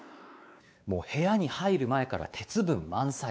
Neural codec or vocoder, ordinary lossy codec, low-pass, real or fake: codec, 16 kHz, 4 kbps, X-Codec, WavLM features, trained on Multilingual LibriSpeech; none; none; fake